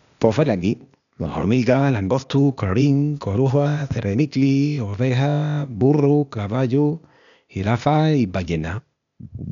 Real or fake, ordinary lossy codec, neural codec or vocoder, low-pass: fake; none; codec, 16 kHz, 0.8 kbps, ZipCodec; 7.2 kHz